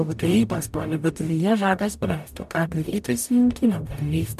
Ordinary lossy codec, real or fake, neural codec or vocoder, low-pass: MP3, 96 kbps; fake; codec, 44.1 kHz, 0.9 kbps, DAC; 14.4 kHz